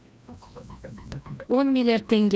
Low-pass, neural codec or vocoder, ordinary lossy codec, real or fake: none; codec, 16 kHz, 1 kbps, FreqCodec, larger model; none; fake